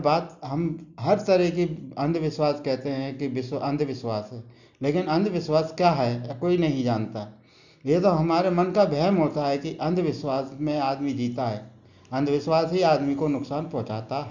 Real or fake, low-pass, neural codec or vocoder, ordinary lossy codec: real; 7.2 kHz; none; none